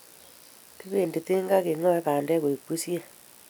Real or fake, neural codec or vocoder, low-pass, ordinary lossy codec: real; none; none; none